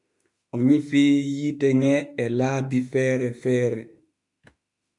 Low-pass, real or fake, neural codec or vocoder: 10.8 kHz; fake; autoencoder, 48 kHz, 32 numbers a frame, DAC-VAE, trained on Japanese speech